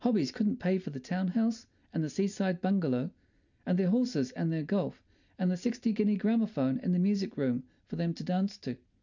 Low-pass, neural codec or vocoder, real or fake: 7.2 kHz; none; real